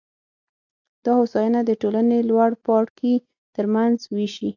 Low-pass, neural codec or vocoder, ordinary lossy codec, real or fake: 7.2 kHz; none; AAC, 48 kbps; real